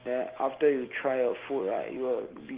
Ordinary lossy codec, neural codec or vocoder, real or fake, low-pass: Opus, 16 kbps; none; real; 3.6 kHz